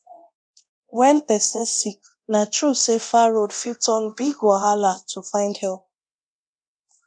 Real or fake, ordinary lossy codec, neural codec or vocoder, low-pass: fake; none; codec, 24 kHz, 0.9 kbps, DualCodec; 9.9 kHz